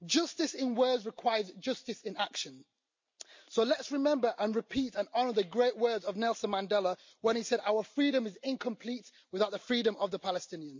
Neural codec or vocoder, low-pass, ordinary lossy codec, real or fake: none; 7.2 kHz; MP3, 48 kbps; real